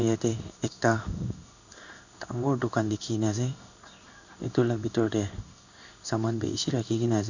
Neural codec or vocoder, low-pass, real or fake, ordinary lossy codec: codec, 16 kHz in and 24 kHz out, 1 kbps, XY-Tokenizer; 7.2 kHz; fake; none